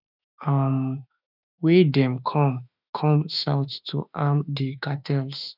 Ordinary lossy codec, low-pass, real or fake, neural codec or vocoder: none; 5.4 kHz; fake; autoencoder, 48 kHz, 32 numbers a frame, DAC-VAE, trained on Japanese speech